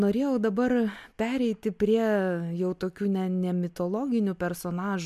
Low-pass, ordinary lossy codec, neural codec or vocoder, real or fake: 14.4 kHz; MP3, 96 kbps; none; real